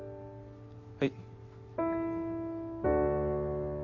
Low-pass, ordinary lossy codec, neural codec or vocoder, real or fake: 7.2 kHz; none; none; real